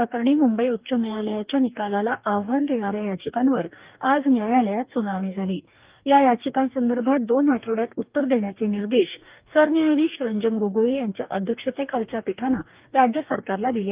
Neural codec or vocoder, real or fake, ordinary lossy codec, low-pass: codec, 44.1 kHz, 2.6 kbps, DAC; fake; Opus, 24 kbps; 3.6 kHz